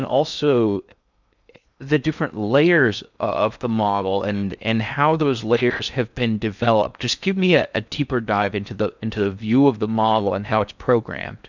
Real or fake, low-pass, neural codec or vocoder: fake; 7.2 kHz; codec, 16 kHz in and 24 kHz out, 0.6 kbps, FocalCodec, streaming, 2048 codes